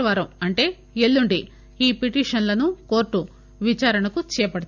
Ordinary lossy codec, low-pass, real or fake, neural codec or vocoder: none; 7.2 kHz; real; none